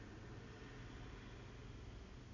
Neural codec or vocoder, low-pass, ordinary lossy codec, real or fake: none; 7.2 kHz; none; real